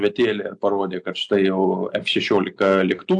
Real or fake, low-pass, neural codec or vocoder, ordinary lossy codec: real; 10.8 kHz; none; AAC, 64 kbps